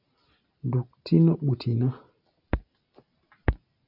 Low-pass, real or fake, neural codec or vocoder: 5.4 kHz; real; none